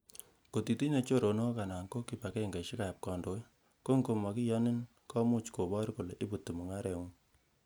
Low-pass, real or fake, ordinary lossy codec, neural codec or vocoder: none; real; none; none